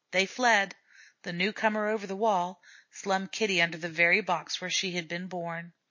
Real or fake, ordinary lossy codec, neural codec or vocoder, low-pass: real; MP3, 32 kbps; none; 7.2 kHz